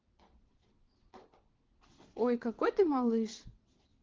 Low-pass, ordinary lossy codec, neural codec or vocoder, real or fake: 7.2 kHz; Opus, 16 kbps; vocoder, 22.05 kHz, 80 mel bands, WaveNeXt; fake